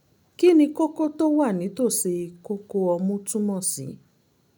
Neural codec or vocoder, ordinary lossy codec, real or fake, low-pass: none; none; real; none